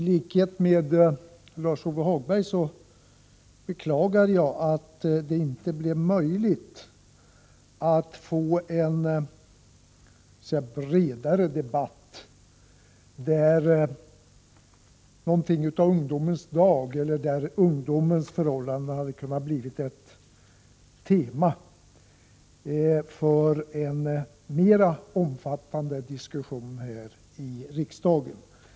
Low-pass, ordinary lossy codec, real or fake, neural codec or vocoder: none; none; real; none